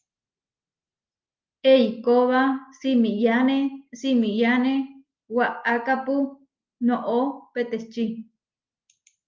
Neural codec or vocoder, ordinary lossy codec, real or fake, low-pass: none; Opus, 32 kbps; real; 7.2 kHz